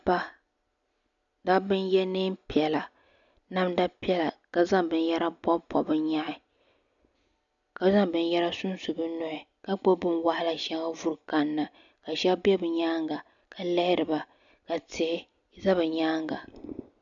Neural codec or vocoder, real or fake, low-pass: none; real; 7.2 kHz